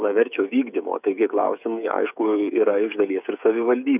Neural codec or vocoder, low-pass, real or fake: codec, 16 kHz, 8 kbps, FreqCodec, smaller model; 3.6 kHz; fake